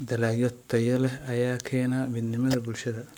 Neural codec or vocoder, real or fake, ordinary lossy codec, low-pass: codec, 44.1 kHz, 7.8 kbps, Pupu-Codec; fake; none; none